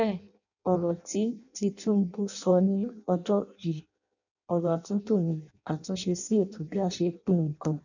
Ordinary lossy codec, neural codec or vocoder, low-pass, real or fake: none; codec, 16 kHz in and 24 kHz out, 0.6 kbps, FireRedTTS-2 codec; 7.2 kHz; fake